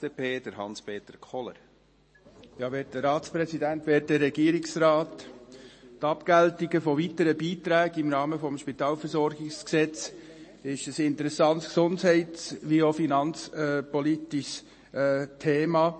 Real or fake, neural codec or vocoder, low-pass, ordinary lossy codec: real; none; 9.9 kHz; MP3, 32 kbps